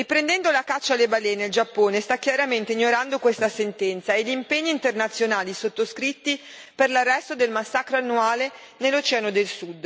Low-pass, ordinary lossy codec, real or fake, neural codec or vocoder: none; none; real; none